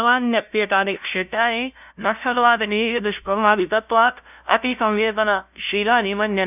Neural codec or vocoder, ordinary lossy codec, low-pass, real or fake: codec, 16 kHz, 0.5 kbps, FunCodec, trained on LibriTTS, 25 frames a second; none; 3.6 kHz; fake